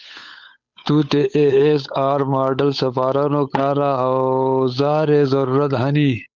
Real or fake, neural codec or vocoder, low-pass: fake; codec, 16 kHz, 8 kbps, FunCodec, trained on Chinese and English, 25 frames a second; 7.2 kHz